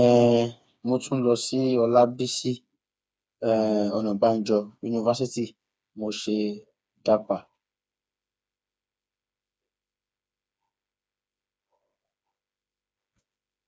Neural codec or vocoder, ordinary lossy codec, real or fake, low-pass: codec, 16 kHz, 4 kbps, FreqCodec, smaller model; none; fake; none